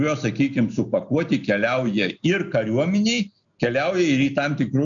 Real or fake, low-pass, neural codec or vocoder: real; 7.2 kHz; none